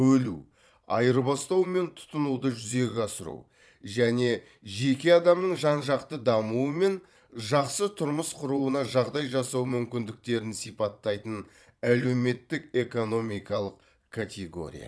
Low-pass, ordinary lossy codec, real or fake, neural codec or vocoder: none; none; fake; vocoder, 22.05 kHz, 80 mel bands, Vocos